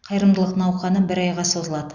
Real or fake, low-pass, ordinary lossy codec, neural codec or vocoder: real; 7.2 kHz; Opus, 64 kbps; none